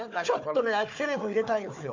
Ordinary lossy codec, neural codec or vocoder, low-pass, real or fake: MP3, 64 kbps; codec, 16 kHz, 4 kbps, FunCodec, trained on Chinese and English, 50 frames a second; 7.2 kHz; fake